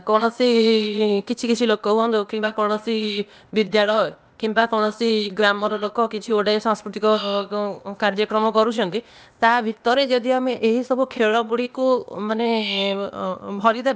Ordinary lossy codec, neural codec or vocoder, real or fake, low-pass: none; codec, 16 kHz, 0.8 kbps, ZipCodec; fake; none